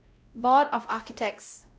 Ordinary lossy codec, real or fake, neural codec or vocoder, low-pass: none; fake; codec, 16 kHz, 0.5 kbps, X-Codec, WavLM features, trained on Multilingual LibriSpeech; none